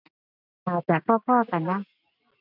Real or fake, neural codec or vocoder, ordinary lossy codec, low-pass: real; none; none; 5.4 kHz